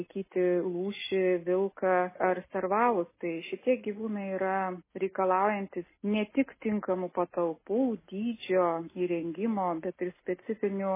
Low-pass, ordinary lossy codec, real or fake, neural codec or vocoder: 3.6 kHz; MP3, 16 kbps; real; none